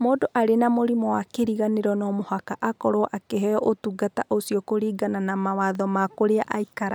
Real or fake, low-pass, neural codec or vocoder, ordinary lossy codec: real; none; none; none